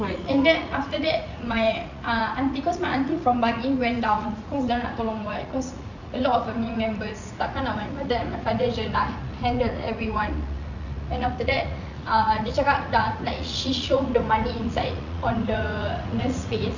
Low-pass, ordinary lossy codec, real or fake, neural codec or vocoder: 7.2 kHz; none; fake; vocoder, 44.1 kHz, 80 mel bands, Vocos